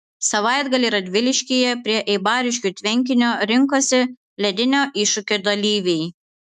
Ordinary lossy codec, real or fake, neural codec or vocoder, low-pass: MP3, 96 kbps; fake; autoencoder, 48 kHz, 128 numbers a frame, DAC-VAE, trained on Japanese speech; 14.4 kHz